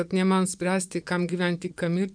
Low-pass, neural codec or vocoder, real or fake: 9.9 kHz; vocoder, 24 kHz, 100 mel bands, Vocos; fake